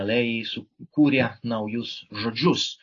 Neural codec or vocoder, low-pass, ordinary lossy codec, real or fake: none; 7.2 kHz; AAC, 32 kbps; real